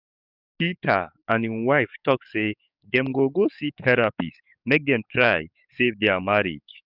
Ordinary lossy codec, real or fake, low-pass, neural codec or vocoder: none; fake; 5.4 kHz; codec, 44.1 kHz, 7.8 kbps, DAC